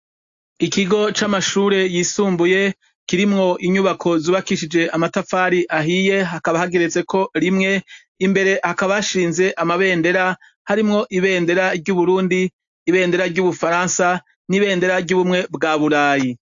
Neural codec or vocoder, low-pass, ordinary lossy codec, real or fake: none; 7.2 kHz; AAC, 64 kbps; real